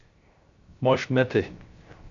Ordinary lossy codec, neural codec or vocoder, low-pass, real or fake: MP3, 48 kbps; codec, 16 kHz, 0.3 kbps, FocalCodec; 7.2 kHz; fake